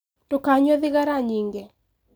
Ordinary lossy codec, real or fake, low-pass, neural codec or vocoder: none; real; none; none